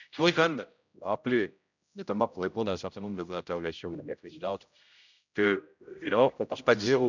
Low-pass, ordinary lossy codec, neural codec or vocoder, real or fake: 7.2 kHz; none; codec, 16 kHz, 0.5 kbps, X-Codec, HuBERT features, trained on general audio; fake